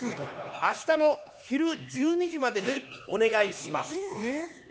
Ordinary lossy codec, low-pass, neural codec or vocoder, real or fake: none; none; codec, 16 kHz, 2 kbps, X-Codec, HuBERT features, trained on LibriSpeech; fake